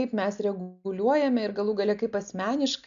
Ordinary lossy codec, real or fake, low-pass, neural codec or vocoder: AAC, 96 kbps; real; 7.2 kHz; none